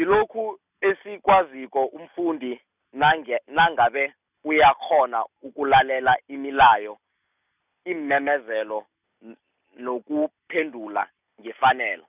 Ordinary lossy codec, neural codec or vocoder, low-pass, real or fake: none; none; 3.6 kHz; real